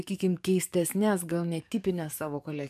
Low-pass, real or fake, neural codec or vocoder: 14.4 kHz; fake; codec, 44.1 kHz, 7.8 kbps, DAC